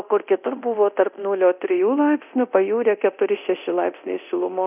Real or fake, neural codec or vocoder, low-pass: fake; codec, 24 kHz, 0.9 kbps, DualCodec; 3.6 kHz